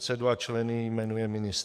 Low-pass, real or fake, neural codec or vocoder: 14.4 kHz; fake; codec, 44.1 kHz, 7.8 kbps, DAC